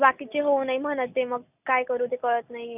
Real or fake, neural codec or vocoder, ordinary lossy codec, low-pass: real; none; none; 3.6 kHz